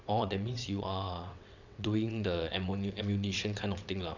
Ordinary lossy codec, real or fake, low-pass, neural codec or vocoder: none; fake; 7.2 kHz; vocoder, 22.05 kHz, 80 mel bands, WaveNeXt